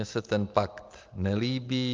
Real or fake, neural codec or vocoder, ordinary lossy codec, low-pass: real; none; Opus, 32 kbps; 7.2 kHz